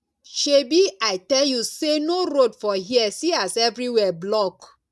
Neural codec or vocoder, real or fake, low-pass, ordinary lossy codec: none; real; none; none